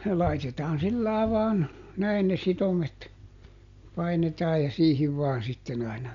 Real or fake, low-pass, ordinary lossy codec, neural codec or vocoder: real; 7.2 kHz; MP3, 64 kbps; none